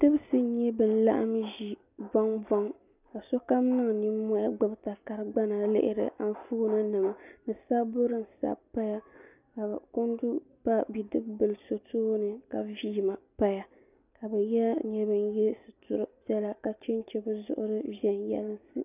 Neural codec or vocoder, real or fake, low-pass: none; real; 3.6 kHz